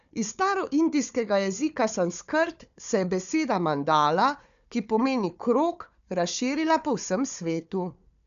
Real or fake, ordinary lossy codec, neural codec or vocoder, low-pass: fake; none; codec, 16 kHz, 4 kbps, FunCodec, trained on Chinese and English, 50 frames a second; 7.2 kHz